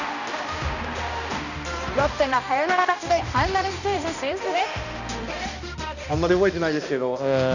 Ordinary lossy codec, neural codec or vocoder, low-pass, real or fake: none; codec, 16 kHz, 1 kbps, X-Codec, HuBERT features, trained on balanced general audio; 7.2 kHz; fake